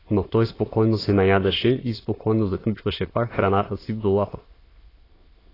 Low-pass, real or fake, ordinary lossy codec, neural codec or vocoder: 5.4 kHz; fake; AAC, 24 kbps; autoencoder, 22.05 kHz, a latent of 192 numbers a frame, VITS, trained on many speakers